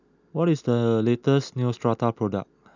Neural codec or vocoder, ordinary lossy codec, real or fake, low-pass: none; none; real; 7.2 kHz